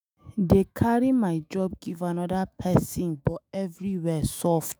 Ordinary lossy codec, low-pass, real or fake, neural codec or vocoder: none; none; fake; autoencoder, 48 kHz, 128 numbers a frame, DAC-VAE, trained on Japanese speech